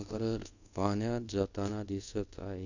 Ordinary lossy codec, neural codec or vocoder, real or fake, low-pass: none; codec, 24 kHz, 0.5 kbps, DualCodec; fake; 7.2 kHz